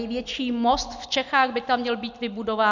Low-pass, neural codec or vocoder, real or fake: 7.2 kHz; none; real